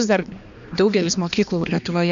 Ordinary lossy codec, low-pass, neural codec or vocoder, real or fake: Opus, 64 kbps; 7.2 kHz; codec, 16 kHz, 2 kbps, X-Codec, HuBERT features, trained on balanced general audio; fake